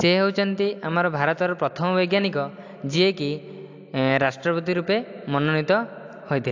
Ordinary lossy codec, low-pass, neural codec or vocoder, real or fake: AAC, 48 kbps; 7.2 kHz; none; real